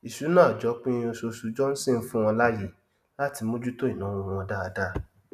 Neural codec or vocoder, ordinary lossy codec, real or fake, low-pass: none; none; real; 14.4 kHz